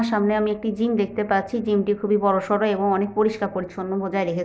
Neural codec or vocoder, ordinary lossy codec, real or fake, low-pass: none; none; real; none